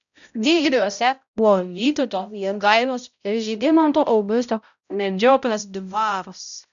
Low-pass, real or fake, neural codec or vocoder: 7.2 kHz; fake; codec, 16 kHz, 0.5 kbps, X-Codec, HuBERT features, trained on balanced general audio